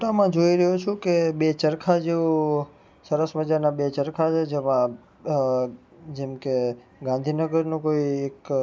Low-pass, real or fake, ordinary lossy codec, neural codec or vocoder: none; real; none; none